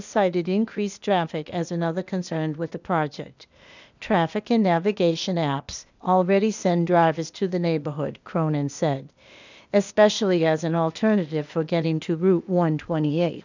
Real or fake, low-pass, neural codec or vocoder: fake; 7.2 kHz; codec, 16 kHz, 0.8 kbps, ZipCodec